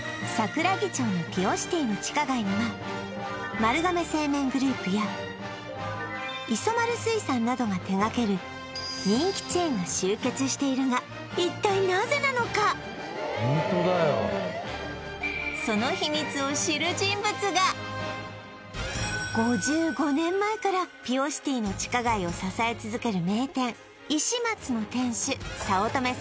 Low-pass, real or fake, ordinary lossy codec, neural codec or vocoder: none; real; none; none